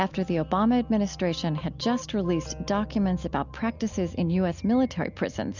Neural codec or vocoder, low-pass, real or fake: none; 7.2 kHz; real